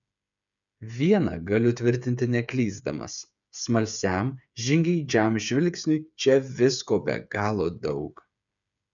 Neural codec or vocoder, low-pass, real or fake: codec, 16 kHz, 8 kbps, FreqCodec, smaller model; 7.2 kHz; fake